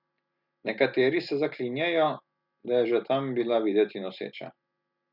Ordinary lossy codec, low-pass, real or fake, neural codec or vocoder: none; 5.4 kHz; real; none